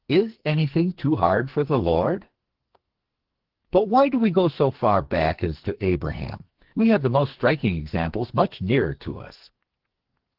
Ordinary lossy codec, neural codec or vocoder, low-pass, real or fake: Opus, 16 kbps; codec, 44.1 kHz, 2.6 kbps, SNAC; 5.4 kHz; fake